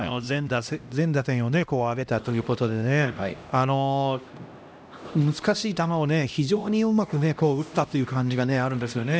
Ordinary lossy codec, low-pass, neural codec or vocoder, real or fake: none; none; codec, 16 kHz, 1 kbps, X-Codec, HuBERT features, trained on LibriSpeech; fake